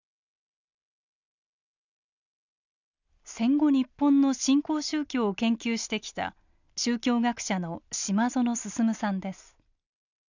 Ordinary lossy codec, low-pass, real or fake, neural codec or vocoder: none; 7.2 kHz; real; none